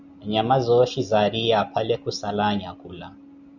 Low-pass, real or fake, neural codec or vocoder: 7.2 kHz; real; none